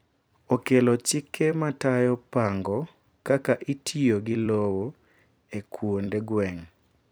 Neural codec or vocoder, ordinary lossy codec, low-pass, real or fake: vocoder, 44.1 kHz, 128 mel bands every 256 samples, BigVGAN v2; none; none; fake